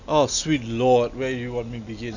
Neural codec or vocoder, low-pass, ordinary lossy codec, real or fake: none; 7.2 kHz; none; real